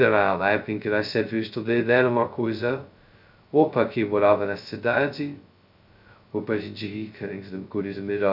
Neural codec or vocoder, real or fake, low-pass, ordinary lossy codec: codec, 16 kHz, 0.2 kbps, FocalCodec; fake; 5.4 kHz; none